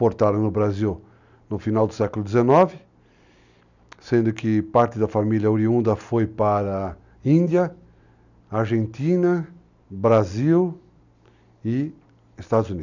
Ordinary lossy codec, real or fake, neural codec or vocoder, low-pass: none; real; none; 7.2 kHz